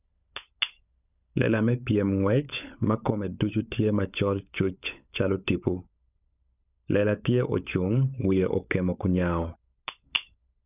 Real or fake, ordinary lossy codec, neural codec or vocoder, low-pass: fake; none; codec, 16 kHz, 16 kbps, FunCodec, trained on LibriTTS, 50 frames a second; 3.6 kHz